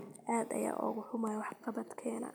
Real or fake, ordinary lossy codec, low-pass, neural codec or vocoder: real; none; none; none